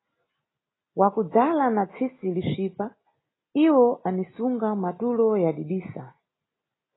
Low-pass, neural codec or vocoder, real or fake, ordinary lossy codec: 7.2 kHz; none; real; AAC, 16 kbps